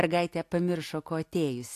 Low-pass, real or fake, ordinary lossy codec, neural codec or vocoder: 14.4 kHz; real; AAC, 64 kbps; none